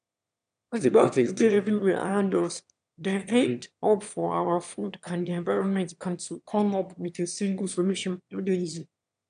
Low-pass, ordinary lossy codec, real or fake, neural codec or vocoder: 9.9 kHz; none; fake; autoencoder, 22.05 kHz, a latent of 192 numbers a frame, VITS, trained on one speaker